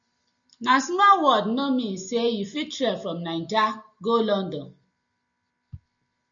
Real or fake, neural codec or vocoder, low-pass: real; none; 7.2 kHz